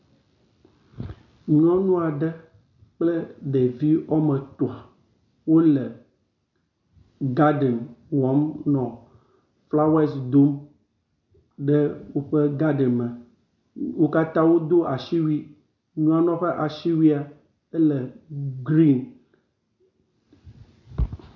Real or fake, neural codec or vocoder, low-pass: real; none; 7.2 kHz